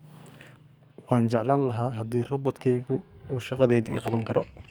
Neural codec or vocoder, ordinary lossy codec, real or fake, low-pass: codec, 44.1 kHz, 2.6 kbps, SNAC; none; fake; none